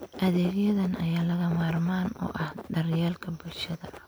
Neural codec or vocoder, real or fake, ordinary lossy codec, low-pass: none; real; none; none